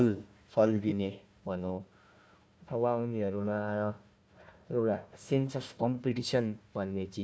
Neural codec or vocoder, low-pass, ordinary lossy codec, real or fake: codec, 16 kHz, 1 kbps, FunCodec, trained on Chinese and English, 50 frames a second; none; none; fake